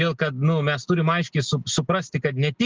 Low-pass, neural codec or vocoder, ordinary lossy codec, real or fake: 7.2 kHz; none; Opus, 16 kbps; real